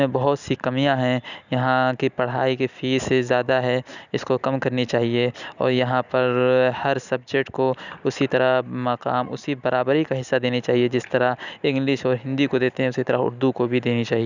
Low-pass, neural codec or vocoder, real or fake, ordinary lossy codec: 7.2 kHz; none; real; none